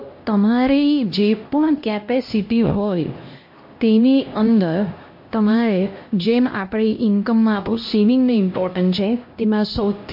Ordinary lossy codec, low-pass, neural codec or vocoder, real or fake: MP3, 32 kbps; 5.4 kHz; codec, 16 kHz, 1 kbps, X-Codec, HuBERT features, trained on LibriSpeech; fake